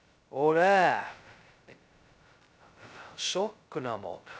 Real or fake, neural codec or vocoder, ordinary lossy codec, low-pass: fake; codec, 16 kHz, 0.2 kbps, FocalCodec; none; none